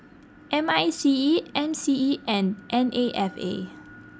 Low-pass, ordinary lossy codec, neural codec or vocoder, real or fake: none; none; none; real